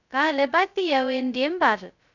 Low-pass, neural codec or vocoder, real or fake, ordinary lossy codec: 7.2 kHz; codec, 16 kHz, 0.2 kbps, FocalCodec; fake; none